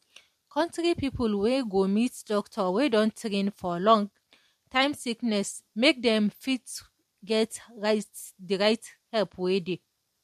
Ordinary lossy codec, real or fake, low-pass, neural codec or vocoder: MP3, 64 kbps; real; 14.4 kHz; none